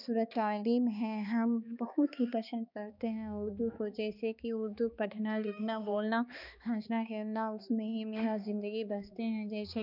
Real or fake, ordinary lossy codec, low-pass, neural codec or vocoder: fake; none; 5.4 kHz; codec, 16 kHz, 2 kbps, X-Codec, HuBERT features, trained on balanced general audio